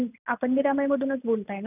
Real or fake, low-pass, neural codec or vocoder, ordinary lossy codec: real; 3.6 kHz; none; none